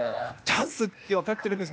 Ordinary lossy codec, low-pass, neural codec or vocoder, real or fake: none; none; codec, 16 kHz, 0.8 kbps, ZipCodec; fake